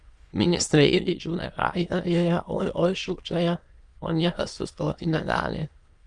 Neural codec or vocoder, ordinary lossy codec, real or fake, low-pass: autoencoder, 22.05 kHz, a latent of 192 numbers a frame, VITS, trained on many speakers; Opus, 32 kbps; fake; 9.9 kHz